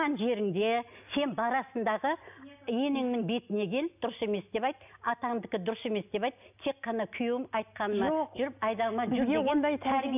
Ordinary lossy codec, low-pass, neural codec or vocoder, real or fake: none; 3.6 kHz; none; real